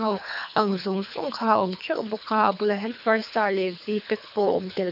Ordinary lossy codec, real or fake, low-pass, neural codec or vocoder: none; fake; 5.4 kHz; codec, 24 kHz, 3 kbps, HILCodec